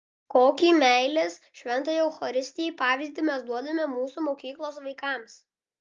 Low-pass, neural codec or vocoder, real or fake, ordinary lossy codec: 7.2 kHz; none; real; Opus, 32 kbps